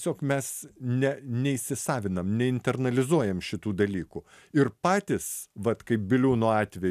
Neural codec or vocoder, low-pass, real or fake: none; 14.4 kHz; real